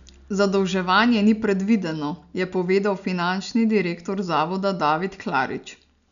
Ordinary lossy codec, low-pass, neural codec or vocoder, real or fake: none; 7.2 kHz; none; real